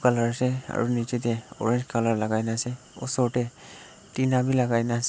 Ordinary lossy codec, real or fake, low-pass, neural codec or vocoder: none; real; none; none